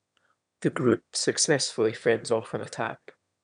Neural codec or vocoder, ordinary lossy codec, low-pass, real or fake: autoencoder, 22.05 kHz, a latent of 192 numbers a frame, VITS, trained on one speaker; none; 9.9 kHz; fake